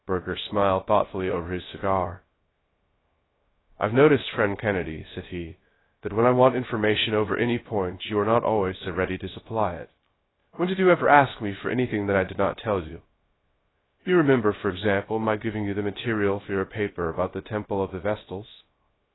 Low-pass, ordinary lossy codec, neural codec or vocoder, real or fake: 7.2 kHz; AAC, 16 kbps; codec, 16 kHz, 0.3 kbps, FocalCodec; fake